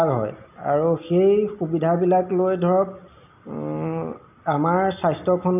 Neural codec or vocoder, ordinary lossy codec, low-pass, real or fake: none; none; 3.6 kHz; real